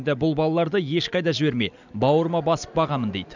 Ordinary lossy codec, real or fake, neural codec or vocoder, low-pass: none; real; none; 7.2 kHz